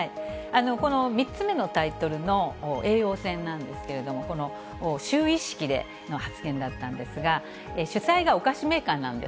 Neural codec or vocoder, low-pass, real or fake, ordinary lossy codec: none; none; real; none